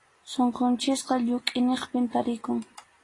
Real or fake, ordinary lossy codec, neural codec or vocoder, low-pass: real; AAC, 32 kbps; none; 10.8 kHz